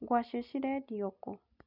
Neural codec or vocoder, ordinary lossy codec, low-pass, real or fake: none; none; 5.4 kHz; real